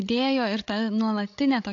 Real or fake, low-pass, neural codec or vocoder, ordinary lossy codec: fake; 7.2 kHz; codec, 16 kHz, 16 kbps, FreqCodec, larger model; AAC, 48 kbps